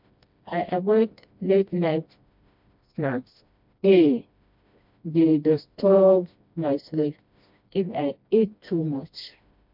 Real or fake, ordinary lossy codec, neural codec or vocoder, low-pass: fake; MP3, 48 kbps; codec, 16 kHz, 1 kbps, FreqCodec, smaller model; 5.4 kHz